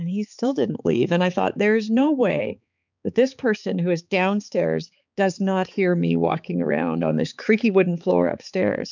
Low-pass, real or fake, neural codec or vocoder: 7.2 kHz; fake; codec, 16 kHz, 4 kbps, X-Codec, HuBERT features, trained on balanced general audio